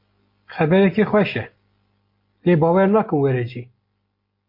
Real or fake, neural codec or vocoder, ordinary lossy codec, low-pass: real; none; MP3, 32 kbps; 5.4 kHz